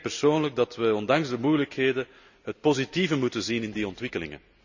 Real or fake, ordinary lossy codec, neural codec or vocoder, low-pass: real; none; none; 7.2 kHz